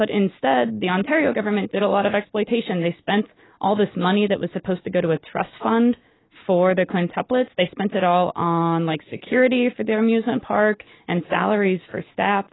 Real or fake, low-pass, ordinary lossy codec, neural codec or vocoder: real; 7.2 kHz; AAC, 16 kbps; none